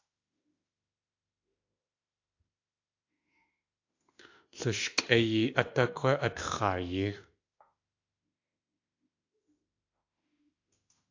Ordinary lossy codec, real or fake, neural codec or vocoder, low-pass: AAC, 48 kbps; fake; autoencoder, 48 kHz, 32 numbers a frame, DAC-VAE, trained on Japanese speech; 7.2 kHz